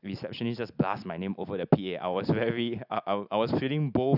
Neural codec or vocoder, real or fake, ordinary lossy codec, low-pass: none; real; none; 5.4 kHz